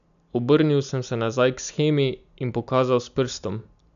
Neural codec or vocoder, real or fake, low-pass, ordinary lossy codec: none; real; 7.2 kHz; none